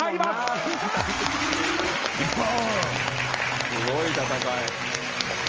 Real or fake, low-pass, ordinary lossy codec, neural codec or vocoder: real; 7.2 kHz; Opus, 24 kbps; none